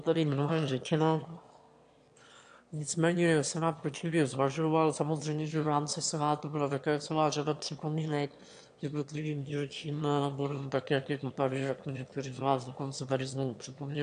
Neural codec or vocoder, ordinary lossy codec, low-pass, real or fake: autoencoder, 22.05 kHz, a latent of 192 numbers a frame, VITS, trained on one speaker; AAC, 64 kbps; 9.9 kHz; fake